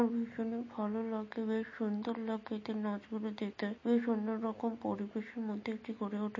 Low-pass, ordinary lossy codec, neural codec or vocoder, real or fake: 7.2 kHz; MP3, 32 kbps; none; real